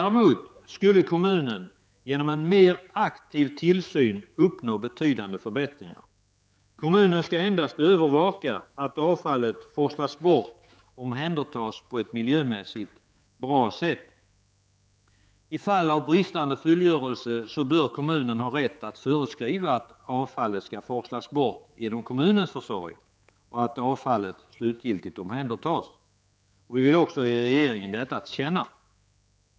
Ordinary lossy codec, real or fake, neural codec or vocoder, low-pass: none; fake; codec, 16 kHz, 4 kbps, X-Codec, HuBERT features, trained on general audio; none